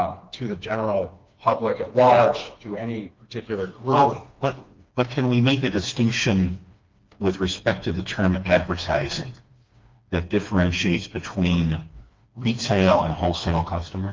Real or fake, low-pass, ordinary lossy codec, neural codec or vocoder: fake; 7.2 kHz; Opus, 32 kbps; codec, 16 kHz, 2 kbps, FreqCodec, smaller model